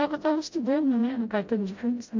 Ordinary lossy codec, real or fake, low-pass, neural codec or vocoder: MP3, 48 kbps; fake; 7.2 kHz; codec, 16 kHz, 0.5 kbps, FreqCodec, smaller model